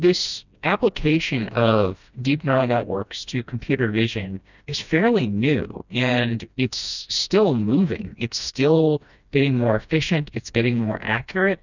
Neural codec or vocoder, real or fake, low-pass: codec, 16 kHz, 1 kbps, FreqCodec, smaller model; fake; 7.2 kHz